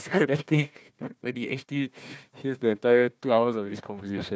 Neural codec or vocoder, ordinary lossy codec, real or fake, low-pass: codec, 16 kHz, 1 kbps, FunCodec, trained on Chinese and English, 50 frames a second; none; fake; none